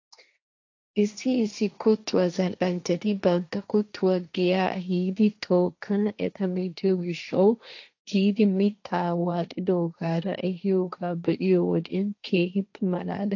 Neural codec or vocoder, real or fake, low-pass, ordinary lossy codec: codec, 16 kHz, 1.1 kbps, Voila-Tokenizer; fake; 7.2 kHz; AAC, 48 kbps